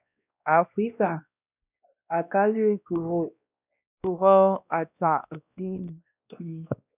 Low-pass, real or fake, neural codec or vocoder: 3.6 kHz; fake; codec, 16 kHz, 1 kbps, X-Codec, WavLM features, trained on Multilingual LibriSpeech